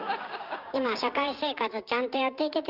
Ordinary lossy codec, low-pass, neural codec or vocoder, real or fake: Opus, 32 kbps; 5.4 kHz; codec, 16 kHz, 6 kbps, DAC; fake